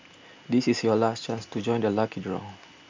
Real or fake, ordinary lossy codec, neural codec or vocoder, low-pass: real; MP3, 64 kbps; none; 7.2 kHz